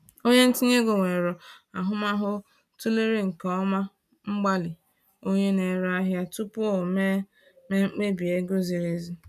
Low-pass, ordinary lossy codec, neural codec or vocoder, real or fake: 14.4 kHz; none; none; real